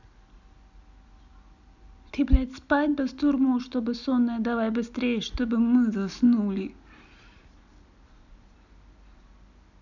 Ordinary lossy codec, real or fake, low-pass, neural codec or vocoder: none; real; 7.2 kHz; none